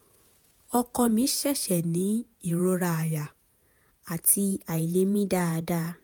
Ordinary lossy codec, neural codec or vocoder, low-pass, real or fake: none; vocoder, 48 kHz, 128 mel bands, Vocos; none; fake